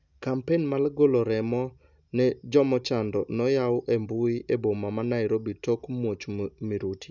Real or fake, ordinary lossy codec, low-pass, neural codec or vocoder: real; none; 7.2 kHz; none